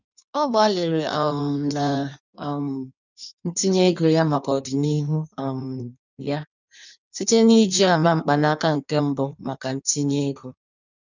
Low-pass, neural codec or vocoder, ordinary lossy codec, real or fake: 7.2 kHz; codec, 16 kHz in and 24 kHz out, 1.1 kbps, FireRedTTS-2 codec; none; fake